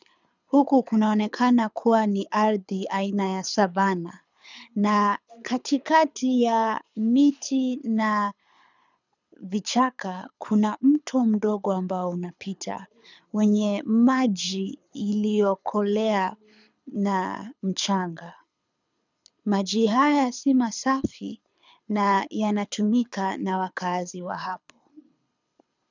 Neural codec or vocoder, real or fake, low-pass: codec, 24 kHz, 6 kbps, HILCodec; fake; 7.2 kHz